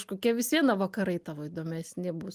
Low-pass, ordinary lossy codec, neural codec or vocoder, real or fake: 14.4 kHz; Opus, 32 kbps; none; real